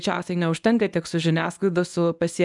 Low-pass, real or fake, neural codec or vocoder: 10.8 kHz; fake; codec, 24 kHz, 0.9 kbps, WavTokenizer, medium speech release version 1